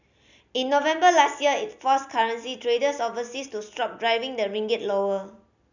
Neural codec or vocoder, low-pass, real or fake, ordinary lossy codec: none; 7.2 kHz; real; none